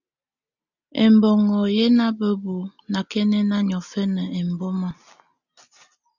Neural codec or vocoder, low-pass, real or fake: none; 7.2 kHz; real